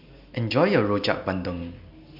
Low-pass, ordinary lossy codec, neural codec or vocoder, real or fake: 5.4 kHz; MP3, 48 kbps; none; real